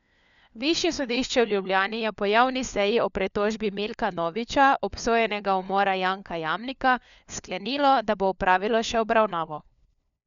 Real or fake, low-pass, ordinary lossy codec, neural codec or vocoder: fake; 7.2 kHz; none; codec, 16 kHz, 4 kbps, FunCodec, trained on LibriTTS, 50 frames a second